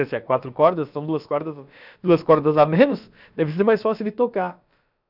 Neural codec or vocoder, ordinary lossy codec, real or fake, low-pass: codec, 16 kHz, about 1 kbps, DyCAST, with the encoder's durations; none; fake; 5.4 kHz